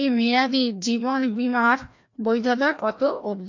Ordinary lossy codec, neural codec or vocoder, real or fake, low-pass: MP3, 48 kbps; codec, 16 kHz, 1 kbps, FreqCodec, larger model; fake; 7.2 kHz